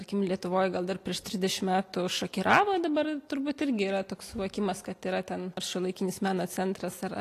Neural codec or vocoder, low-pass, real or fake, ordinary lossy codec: none; 14.4 kHz; real; AAC, 48 kbps